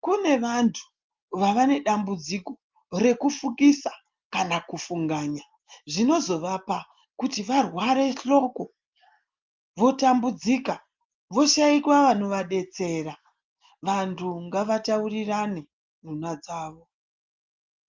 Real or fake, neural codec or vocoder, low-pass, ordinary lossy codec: real; none; 7.2 kHz; Opus, 24 kbps